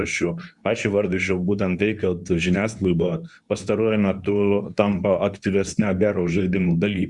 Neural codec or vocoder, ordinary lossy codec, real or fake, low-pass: codec, 24 kHz, 0.9 kbps, WavTokenizer, medium speech release version 2; Opus, 64 kbps; fake; 10.8 kHz